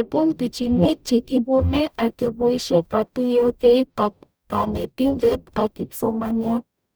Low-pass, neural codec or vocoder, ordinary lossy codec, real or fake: none; codec, 44.1 kHz, 0.9 kbps, DAC; none; fake